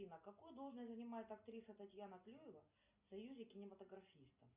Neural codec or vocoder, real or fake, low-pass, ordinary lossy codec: none; real; 3.6 kHz; Opus, 64 kbps